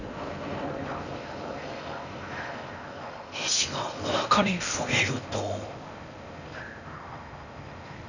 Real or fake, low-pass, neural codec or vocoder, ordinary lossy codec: fake; 7.2 kHz; codec, 16 kHz in and 24 kHz out, 0.6 kbps, FocalCodec, streaming, 4096 codes; none